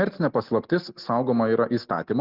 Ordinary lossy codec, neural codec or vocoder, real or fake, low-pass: Opus, 32 kbps; none; real; 5.4 kHz